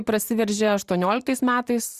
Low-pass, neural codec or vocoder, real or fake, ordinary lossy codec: 14.4 kHz; vocoder, 44.1 kHz, 128 mel bands every 512 samples, BigVGAN v2; fake; Opus, 64 kbps